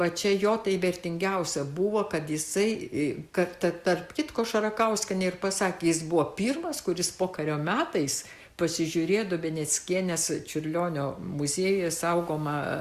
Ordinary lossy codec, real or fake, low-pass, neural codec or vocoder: Opus, 64 kbps; real; 14.4 kHz; none